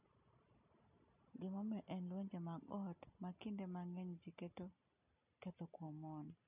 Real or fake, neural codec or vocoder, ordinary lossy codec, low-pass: real; none; none; 3.6 kHz